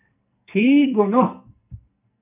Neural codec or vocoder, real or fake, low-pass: codec, 44.1 kHz, 2.6 kbps, SNAC; fake; 3.6 kHz